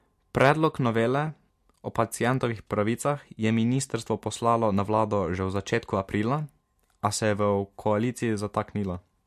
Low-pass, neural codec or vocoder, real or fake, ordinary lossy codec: 14.4 kHz; none; real; MP3, 64 kbps